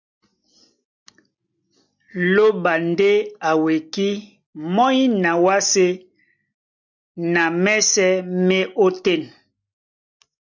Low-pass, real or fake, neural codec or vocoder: 7.2 kHz; real; none